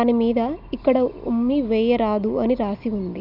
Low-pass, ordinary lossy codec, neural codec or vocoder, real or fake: 5.4 kHz; none; none; real